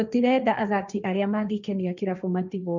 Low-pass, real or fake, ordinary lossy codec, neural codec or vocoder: 7.2 kHz; fake; none; codec, 16 kHz, 1.1 kbps, Voila-Tokenizer